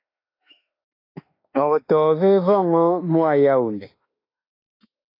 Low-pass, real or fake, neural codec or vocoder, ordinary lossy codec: 5.4 kHz; fake; autoencoder, 48 kHz, 32 numbers a frame, DAC-VAE, trained on Japanese speech; AAC, 32 kbps